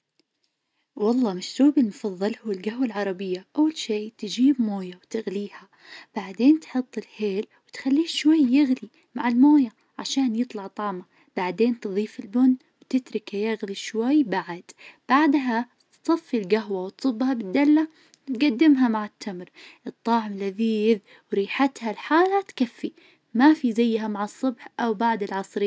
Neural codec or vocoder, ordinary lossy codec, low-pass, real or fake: none; none; none; real